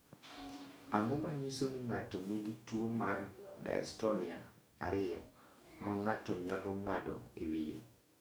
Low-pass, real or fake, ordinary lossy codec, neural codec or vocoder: none; fake; none; codec, 44.1 kHz, 2.6 kbps, DAC